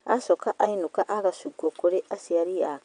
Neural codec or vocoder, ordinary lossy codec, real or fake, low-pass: vocoder, 22.05 kHz, 80 mel bands, WaveNeXt; none; fake; 9.9 kHz